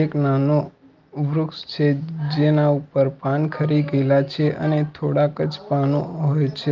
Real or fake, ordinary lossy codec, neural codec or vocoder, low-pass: real; Opus, 32 kbps; none; 7.2 kHz